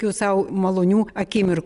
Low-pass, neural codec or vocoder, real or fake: 10.8 kHz; none; real